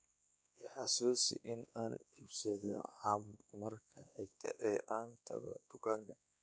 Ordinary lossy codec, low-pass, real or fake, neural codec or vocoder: none; none; fake; codec, 16 kHz, 2 kbps, X-Codec, WavLM features, trained on Multilingual LibriSpeech